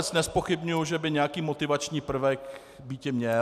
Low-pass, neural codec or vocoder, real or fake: 14.4 kHz; none; real